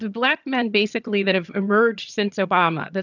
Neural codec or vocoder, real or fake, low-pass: vocoder, 22.05 kHz, 80 mel bands, HiFi-GAN; fake; 7.2 kHz